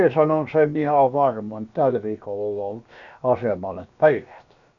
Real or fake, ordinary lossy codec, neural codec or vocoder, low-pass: fake; none; codec, 16 kHz, about 1 kbps, DyCAST, with the encoder's durations; 7.2 kHz